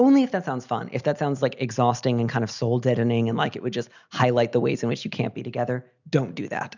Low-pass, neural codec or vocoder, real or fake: 7.2 kHz; none; real